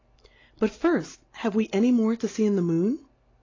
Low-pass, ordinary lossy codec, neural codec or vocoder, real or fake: 7.2 kHz; AAC, 32 kbps; none; real